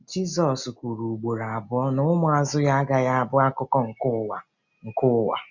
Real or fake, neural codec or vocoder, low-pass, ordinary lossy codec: real; none; 7.2 kHz; none